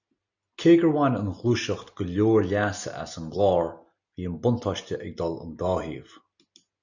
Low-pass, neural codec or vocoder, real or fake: 7.2 kHz; none; real